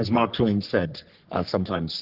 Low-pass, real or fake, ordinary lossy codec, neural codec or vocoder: 5.4 kHz; fake; Opus, 16 kbps; codec, 44.1 kHz, 3.4 kbps, Pupu-Codec